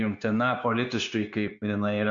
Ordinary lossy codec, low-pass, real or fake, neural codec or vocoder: Opus, 64 kbps; 7.2 kHz; fake; codec, 16 kHz, 0.9 kbps, LongCat-Audio-Codec